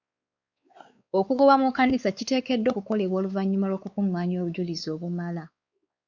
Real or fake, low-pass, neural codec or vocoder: fake; 7.2 kHz; codec, 16 kHz, 4 kbps, X-Codec, WavLM features, trained on Multilingual LibriSpeech